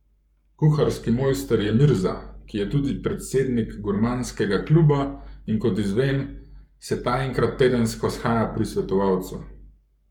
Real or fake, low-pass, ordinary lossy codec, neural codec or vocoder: fake; 19.8 kHz; none; codec, 44.1 kHz, 7.8 kbps, Pupu-Codec